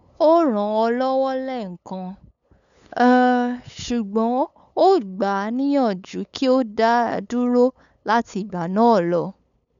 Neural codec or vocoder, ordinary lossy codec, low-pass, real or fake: codec, 16 kHz, 8 kbps, FunCodec, trained on LibriTTS, 25 frames a second; MP3, 96 kbps; 7.2 kHz; fake